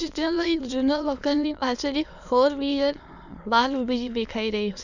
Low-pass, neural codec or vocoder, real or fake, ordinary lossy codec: 7.2 kHz; autoencoder, 22.05 kHz, a latent of 192 numbers a frame, VITS, trained on many speakers; fake; none